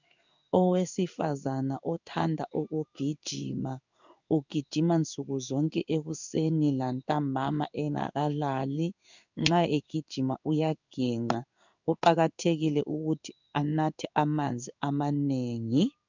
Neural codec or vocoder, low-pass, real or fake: codec, 16 kHz in and 24 kHz out, 1 kbps, XY-Tokenizer; 7.2 kHz; fake